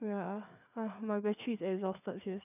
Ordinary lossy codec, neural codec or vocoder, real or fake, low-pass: none; codec, 16 kHz, 4 kbps, FreqCodec, larger model; fake; 3.6 kHz